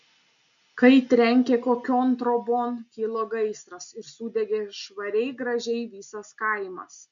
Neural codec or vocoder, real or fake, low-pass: none; real; 7.2 kHz